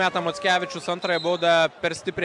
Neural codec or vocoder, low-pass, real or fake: none; 10.8 kHz; real